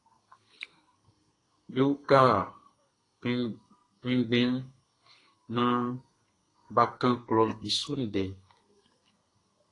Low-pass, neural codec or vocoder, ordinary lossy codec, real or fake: 10.8 kHz; codec, 32 kHz, 1.9 kbps, SNAC; AAC, 32 kbps; fake